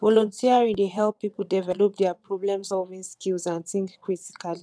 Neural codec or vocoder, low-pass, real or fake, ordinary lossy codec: vocoder, 22.05 kHz, 80 mel bands, WaveNeXt; none; fake; none